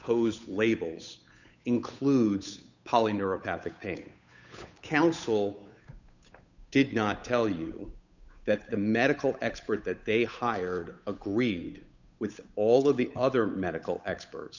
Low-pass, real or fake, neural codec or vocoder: 7.2 kHz; fake; codec, 16 kHz, 8 kbps, FunCodec, trained on Chinese and English, 25 frames a second